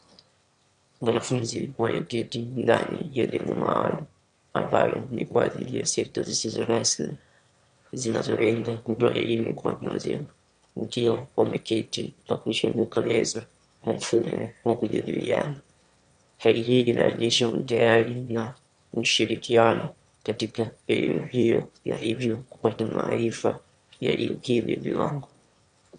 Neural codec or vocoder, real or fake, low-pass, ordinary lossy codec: autoencoder, 22.05 kHz, a latent of 192 numbers a frame, VITS, trained on one speaker; fake; 9.9 kHz; MP3, 64 kbps